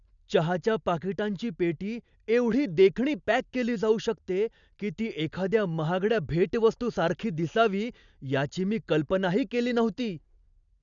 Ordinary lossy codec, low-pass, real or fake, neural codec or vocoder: none; 7.2 kHz; real; none